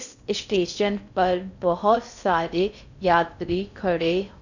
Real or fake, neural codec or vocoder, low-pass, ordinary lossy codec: fake; codec, 16 kHz in and 24 kHz out, 0.6 kbps, FocalCodec, streaming, 4096 codes; 7.2 kHz; none